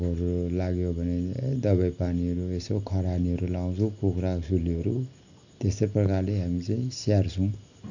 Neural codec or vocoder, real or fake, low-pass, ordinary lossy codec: none; real; 7.2 kHz; none